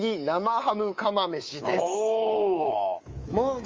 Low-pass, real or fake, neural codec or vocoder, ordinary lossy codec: 7.2 kHz; fake; codec, 24 kHz, 3.1 kbps, DualCodec; Opus, 32 kbps